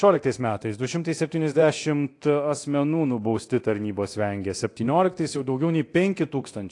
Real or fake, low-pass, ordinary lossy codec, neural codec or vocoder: fake; 10.8 kHz; AAC, 48 kbps; codec, 24 kHz, 0.9 kbps, DualCodec